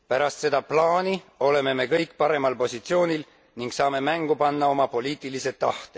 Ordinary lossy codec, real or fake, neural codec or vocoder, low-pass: none; real; none; none